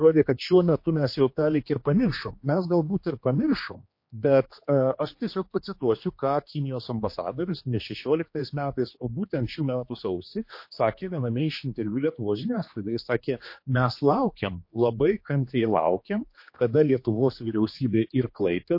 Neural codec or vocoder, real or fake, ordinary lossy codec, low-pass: codec, 16 kHz, 2 kbps, X-Codec, HuBERT features, trained on general audio; fake; MP3, 32 kbps; 5.4 kHz